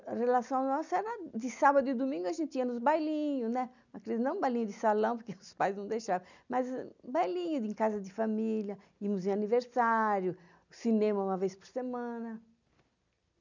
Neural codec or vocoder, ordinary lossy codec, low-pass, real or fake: none; none; 7.2 kHz; real